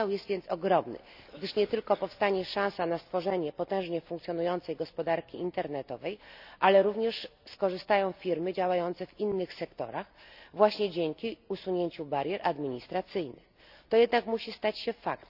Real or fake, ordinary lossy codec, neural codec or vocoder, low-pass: real; none; none; 5.4 kHz